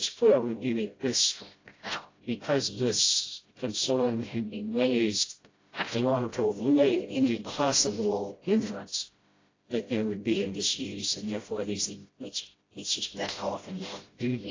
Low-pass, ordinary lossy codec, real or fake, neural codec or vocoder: 7.2 kHz; AAC, 32 kbps; fake; codec, 16 kHz, 0.5 kbps, FreqCodec, smaller model